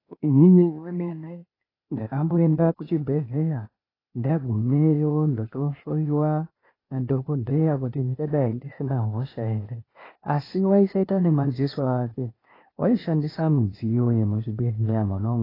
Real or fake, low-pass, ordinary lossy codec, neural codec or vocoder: fake; 5.4 kHz; AAC, 24 kbps; codec, 16 kHz, 0.8 kbps, ZipCodec